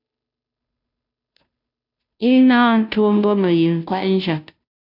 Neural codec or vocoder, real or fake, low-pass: codec, 16 kHz, 0.5 kbps, FunCodec, trained on Chinese and English, 25 frames a second; fake; 5.4 kHz